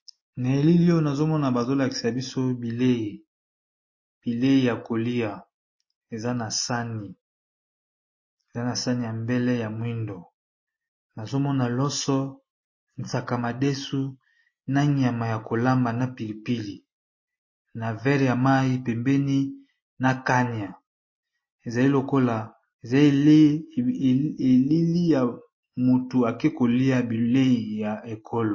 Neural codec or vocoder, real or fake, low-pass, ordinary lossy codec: none; real; 7.2 kHz; MP3, 32 kbps